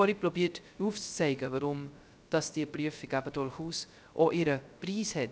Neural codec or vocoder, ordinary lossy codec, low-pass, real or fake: codec, 16 kHz, 0.3 kbps, FocalCodec; none; none; fake